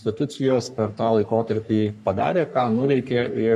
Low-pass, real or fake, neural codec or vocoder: 14.4 kHz; fake; codec, 44.1 kHz, 3.4 kbps, Pupu-Codec